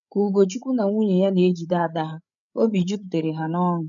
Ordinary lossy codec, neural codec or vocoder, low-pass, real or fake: none; codec, 16 kHz, 8 kbps, FreqCodec, larger model; 7.2 kHz; fake